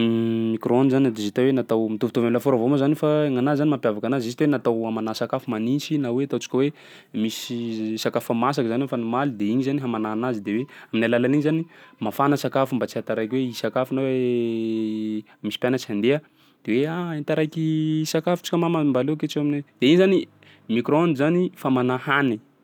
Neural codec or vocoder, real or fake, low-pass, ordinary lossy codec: none; real; 19.8 kHz; none